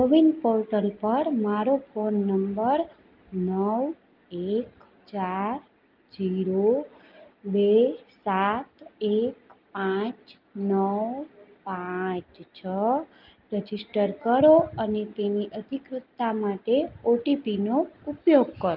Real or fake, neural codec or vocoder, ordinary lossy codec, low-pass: real; none; Opus, 32 kbps; 5.4 kHz